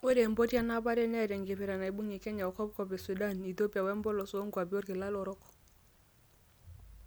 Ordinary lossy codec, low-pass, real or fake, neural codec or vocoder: none; none; real; none